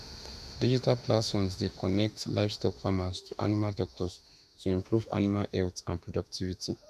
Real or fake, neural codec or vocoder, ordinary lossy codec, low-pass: fake; autoencoder, 48 kHz, 32 numbers a frame, DAC-VAE, trained on Japanese speech; none; 14.4 kHz